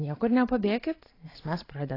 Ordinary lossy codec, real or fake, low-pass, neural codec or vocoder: AAC, 24 kbps; fake; 5.4 kHz; vocoder, 44.1 kHz, 128 mel bands every 512 samples, BigVGAN v2